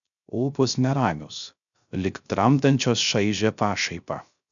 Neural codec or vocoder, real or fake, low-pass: codec, 16 kHz, 0.3 kbps, FocalCodec; fake; 7.2 kHz